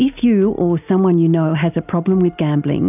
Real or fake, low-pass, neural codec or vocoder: real; 3.6 kHz; none